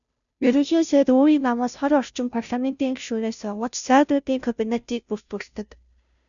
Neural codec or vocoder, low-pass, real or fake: codec, 16 kHz, 0.5 kbps, FunCodec, trained on Chinese and English, 25 frames a second; 7.2 kHz; fake